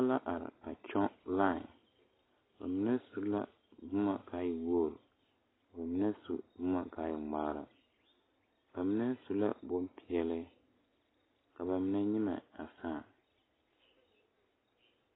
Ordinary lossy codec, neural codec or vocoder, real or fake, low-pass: AAC, 16 kbps; none; real; 7.2 kHz